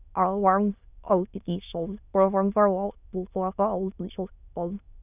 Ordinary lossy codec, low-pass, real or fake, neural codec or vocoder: none; 3.6 kHz; fake; autoencoder, 22.05 kHz, a latent of 192 numbers a frame, VITS, trained on many speakers